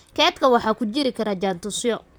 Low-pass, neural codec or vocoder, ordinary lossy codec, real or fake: none; vocoder, 44.1 kHz, 128 mel bands, Pupu-Vocoder; none; fake